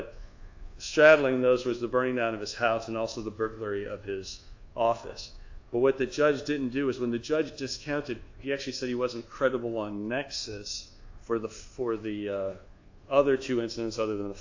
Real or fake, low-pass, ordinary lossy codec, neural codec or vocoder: fake; 7.2 kHz; AAC, 48 kbps; codec, 24 kHz, 1.2 kbps, DualCodec